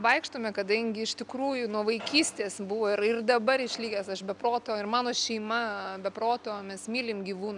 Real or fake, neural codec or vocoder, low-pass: real; none; 10.8 kHz